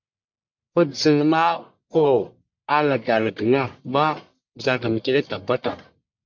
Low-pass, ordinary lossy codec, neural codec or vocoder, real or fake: 7.2 kHz; MP3, 48 kbps; codec, 44.1 kHz, 1.7 kbps, Pupu-Codec; fake